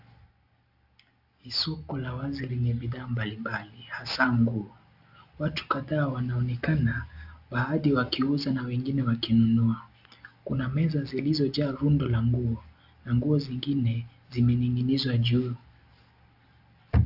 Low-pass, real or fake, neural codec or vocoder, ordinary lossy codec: 5.4 kHz; real; none; AAC, 48 kbps